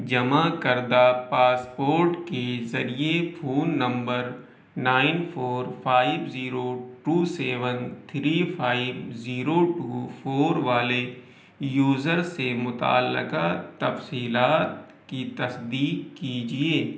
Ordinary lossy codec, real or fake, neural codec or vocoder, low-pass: none; real; none; none